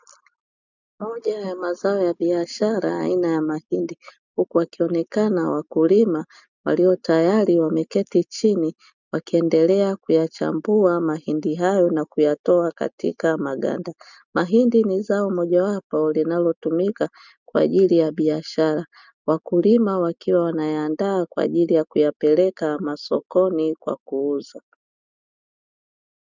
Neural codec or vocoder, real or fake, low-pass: none; real; 7.2 kHz